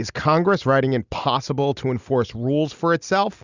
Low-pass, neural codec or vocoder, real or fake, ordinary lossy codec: 7.2 kHz; none; real; Opus, 64 kbps